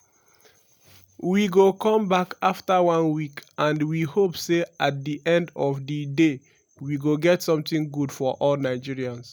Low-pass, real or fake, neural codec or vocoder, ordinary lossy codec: none; real; none; none